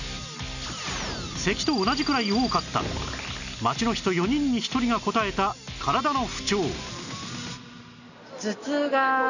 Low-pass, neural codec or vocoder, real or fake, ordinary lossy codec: 7.2 kHz; none; real; none